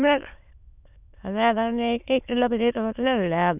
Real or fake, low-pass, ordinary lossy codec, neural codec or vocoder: fake; 3.6 kHz; none; autoencoder, 22.05 kHz, a latent of 192 numbers a frame, VITS, trained on many speakers